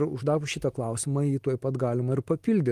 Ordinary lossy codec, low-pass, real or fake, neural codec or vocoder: Opus, 24 kbps; 14.4 kHz; real; none